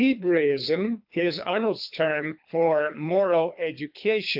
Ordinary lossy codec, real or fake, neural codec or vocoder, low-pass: AAC, 48 kbps; fake; codec, 24 kHz, 3 kbps, HILCodec; 5.4 kHz